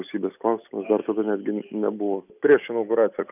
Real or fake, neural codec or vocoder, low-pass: real; none; 3.6 kHz